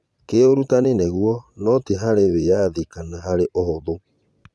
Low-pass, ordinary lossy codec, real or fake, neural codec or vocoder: none; none; fake; vocoder, 22.05 kHz, 80 mel bands, Vocos